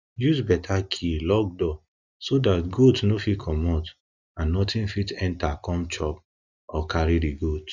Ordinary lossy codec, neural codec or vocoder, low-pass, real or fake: none; none; 7.2 kHz; real